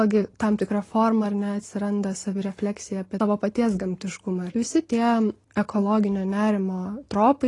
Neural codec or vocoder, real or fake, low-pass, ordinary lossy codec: none; real; 10.8 kHz; AAC, 32 kbps